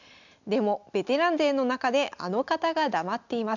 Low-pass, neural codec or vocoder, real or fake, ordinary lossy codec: 7.2 kHz; none; real; none